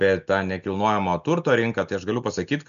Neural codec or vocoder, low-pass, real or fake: none; 7.2 kHz; real